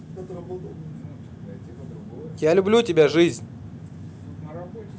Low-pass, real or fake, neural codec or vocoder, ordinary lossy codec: none; real; none; none